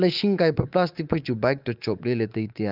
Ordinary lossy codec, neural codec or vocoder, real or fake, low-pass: Opus, 24 kbps; codec, 24 kHz, 3.1 kbps, DualCodec; fake; 5.4 kHz